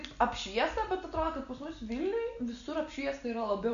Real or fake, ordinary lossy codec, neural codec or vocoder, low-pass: real; MP3, 96 kbps; none; 7.2 kHz